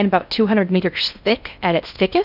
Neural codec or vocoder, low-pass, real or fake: codec, 16 kHz in and 24 kHz out, 0.6 kbps, FocalCodec, streaming, 4096 codes; 5.4 kHz; fake